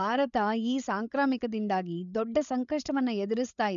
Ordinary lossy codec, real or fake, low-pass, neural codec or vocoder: none; fake; 7.2 kHz; codec, 16 kHz, 16 kbps, FreqCodec, larger model